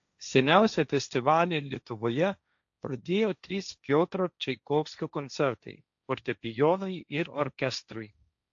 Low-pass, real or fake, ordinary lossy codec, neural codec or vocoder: 7.2 kHz; fake; AAC, 64 kbps; codec, 16 kHz, 1.1 kbps, Voila-Tokenizer